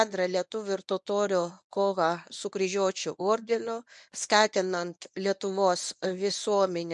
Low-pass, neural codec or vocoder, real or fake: 10.8 kHz; codec, 24 kHz, 0.9 kbps, WavTokenizer, medium speech release version 1; fake